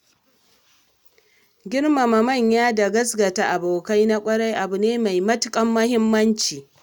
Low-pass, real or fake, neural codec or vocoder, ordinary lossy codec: 19.8 kHz; real; none; none